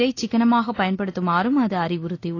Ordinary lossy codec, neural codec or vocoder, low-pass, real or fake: AAC, 32 kbps; none; 7.2 kHz; real